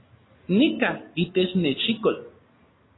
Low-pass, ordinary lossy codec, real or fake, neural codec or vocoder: 7.2 kHz; AAC, 16 kbps; real; none